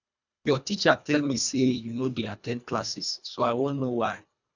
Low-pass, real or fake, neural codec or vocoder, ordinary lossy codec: 7.2 kHz; fake; codec, 24 kHz, 1.5 kbps, HILCodec; none